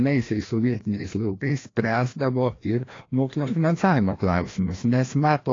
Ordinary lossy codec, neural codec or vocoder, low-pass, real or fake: AAC, 32 kbps; codec, 16 kHz, 1 kbps, FreqCodec, larger model; 7.2 kHz; fake